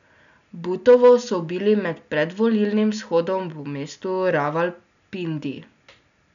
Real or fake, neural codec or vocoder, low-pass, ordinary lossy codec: real; none; 7.2 kHz; none